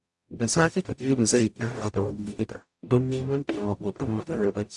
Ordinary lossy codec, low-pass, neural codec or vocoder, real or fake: AAC, 64 kbps; 10.8 kHz; codec, 44.1 kHz, 0.9 kbps, DAC; fake